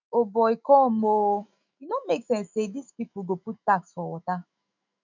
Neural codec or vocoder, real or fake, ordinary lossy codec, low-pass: autoencoder, 48 kHz, 128 numbers a frame, DAC-VAE, trained on Japanese speech; fake; none; 7.2 kHz